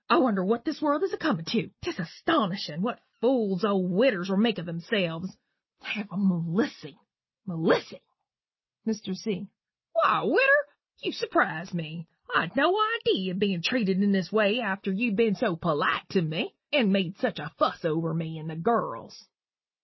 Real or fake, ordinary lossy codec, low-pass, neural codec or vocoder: real; MP3, 24 kbps; 7.2 kHz; none